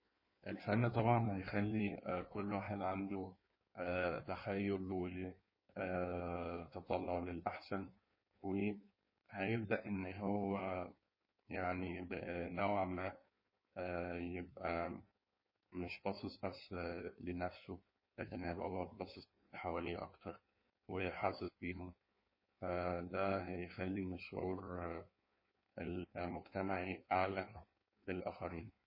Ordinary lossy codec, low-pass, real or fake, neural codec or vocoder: MP3, 24 kbps; 5.4 kHz; fake; codec, 16 kHz in and 24 kHz out, 1.1 kbps, FireRedTTS-2 codec